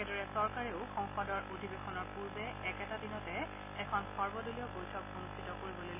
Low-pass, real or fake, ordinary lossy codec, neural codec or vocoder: 3.6 kHz; real; AAC, 24 kbps; none